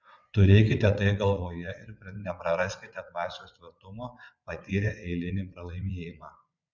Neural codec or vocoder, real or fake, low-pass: vocoder, 22.05 kHz, 80 mel bands, WaveNeXt; fake; 7.2 kHz